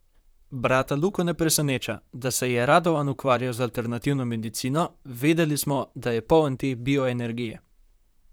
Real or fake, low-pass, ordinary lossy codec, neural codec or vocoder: fake; none; none; vocoder, 44.1 kHz, 128 mel bands, Pupu-Vocoder